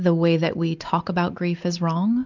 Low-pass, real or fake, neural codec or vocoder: 7.2 kHz; real; none